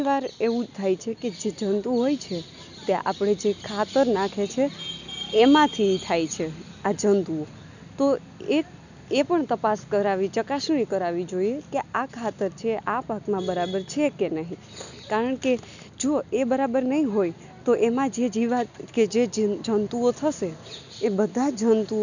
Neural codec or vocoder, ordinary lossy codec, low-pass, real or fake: none; none; 7.2 kHz; real